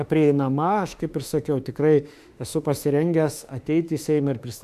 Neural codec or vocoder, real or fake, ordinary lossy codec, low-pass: autoencoder, 48 kHz, 32 numbers a frame, DAC-VAE, trained on Japanese speech; fake; AAC, 96 kbps; 14.4 kHz